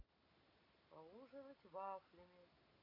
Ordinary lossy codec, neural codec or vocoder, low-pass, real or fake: MP3, 32 kbps; none; 5.4 kHz; real